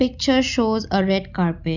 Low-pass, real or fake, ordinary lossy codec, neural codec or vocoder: 7.2 kHz; real; none; none